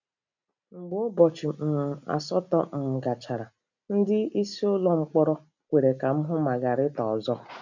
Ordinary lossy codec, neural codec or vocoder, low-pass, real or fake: none; none; 7.2 kHz; real